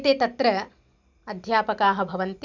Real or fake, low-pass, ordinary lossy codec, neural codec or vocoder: real; 7.2 kHz; none; none